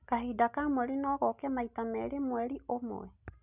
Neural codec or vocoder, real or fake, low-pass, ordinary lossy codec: none; real; 3.6 kHz; none